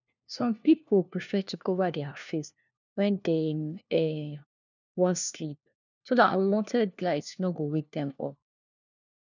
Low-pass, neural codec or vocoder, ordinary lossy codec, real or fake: 7.2 kHz; codec, 16 kHz, 1 kbps, FunCodec, trained on LibriTTS, 50 frames a second; none; fake